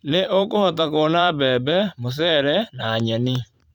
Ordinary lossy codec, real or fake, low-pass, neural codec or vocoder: none; fake; 19.8 kHz; vocoder, 44.1 kHz, 128 mel bands every 512 samples, BigVGAN v2